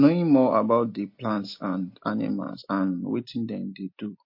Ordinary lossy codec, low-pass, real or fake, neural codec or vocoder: MP3, 32 kbps; 5.4 kHz; real; none